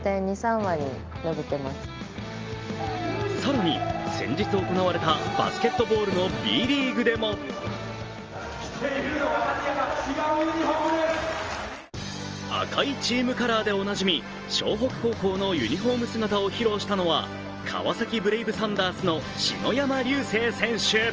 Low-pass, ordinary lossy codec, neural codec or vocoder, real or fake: 7.2 kHz; Opus, 24 kbps; none; real